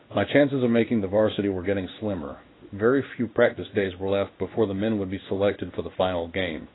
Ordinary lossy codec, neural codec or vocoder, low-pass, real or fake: AAC, 16 kbps; codec, 16 kHz in and 24 kHz out, 1 kbps, XY-Tokenizer; 7.2 kHz; fake